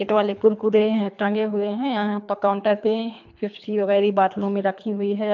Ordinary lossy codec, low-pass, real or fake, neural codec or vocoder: MP3, 64 kbps; 7.2 kHz; fake; codec, 24 kHz, 3 kbps, HILCodec